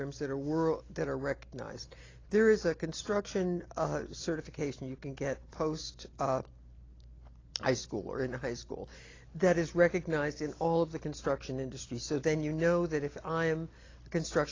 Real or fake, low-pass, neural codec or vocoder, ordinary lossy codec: real; 7.2 kHz; none; AAC, 32 kbps